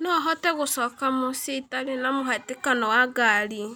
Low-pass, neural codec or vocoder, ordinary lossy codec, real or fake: none; none; none; real